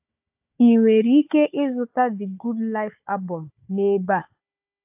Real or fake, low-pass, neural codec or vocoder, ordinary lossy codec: fake; 3.6 kHz; codec, 16 kHz, 4 kbps, FunCodec, trained on Chinese and English, 50 frames a second; AAC, 32 kbps